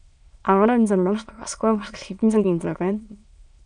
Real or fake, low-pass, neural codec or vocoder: fake; 9.9 kHz; autoencoder, 22.05 kHz, a latent of 192 numbers a frame, VITS, trained on many speakers